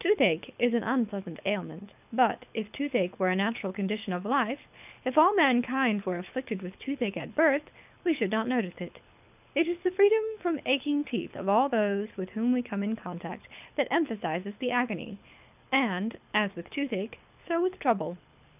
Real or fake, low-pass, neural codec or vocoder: fake; 3.6 kHz; codec, 24 kHz, 6 kbps, HILCodec